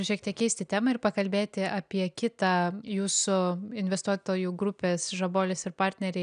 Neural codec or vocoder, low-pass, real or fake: none; 9.9 kHz; real